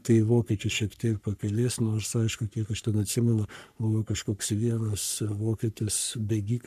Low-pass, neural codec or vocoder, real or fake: 14.4 kHz; codec, 44.1 kHz, 3.4 kbps, Pupu-Codec; fake